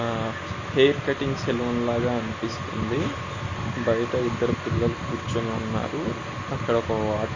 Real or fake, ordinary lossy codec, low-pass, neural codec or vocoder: real; MP3, 32 kbps; 7.2 kHz; none